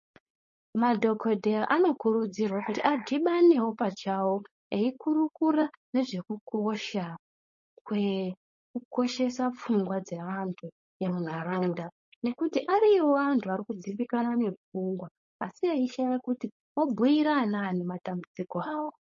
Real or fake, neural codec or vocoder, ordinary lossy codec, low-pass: fake; codec, 16 kHz, 4.8 kbps, FACodec; MP3, 32 kbps; 7.2 kHz